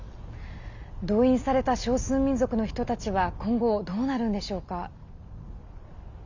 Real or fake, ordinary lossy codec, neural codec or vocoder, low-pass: real; none; none; 7.2 kHz